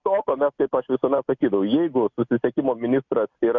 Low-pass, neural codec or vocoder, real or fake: 7.2 kHz; none; real